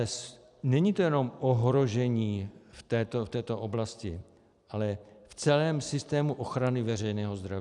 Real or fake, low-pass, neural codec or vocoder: fake; 10.8 kHz; vocoder, 44.1 kHz, 128 mel bands every 256 samples, BigVGAN v2